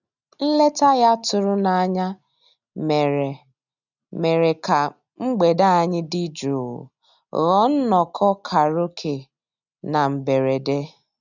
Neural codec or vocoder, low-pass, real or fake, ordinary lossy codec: none; 7.2 kHz; real; none